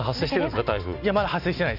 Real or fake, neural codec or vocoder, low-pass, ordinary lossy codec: real; none; 5.4 kHz; none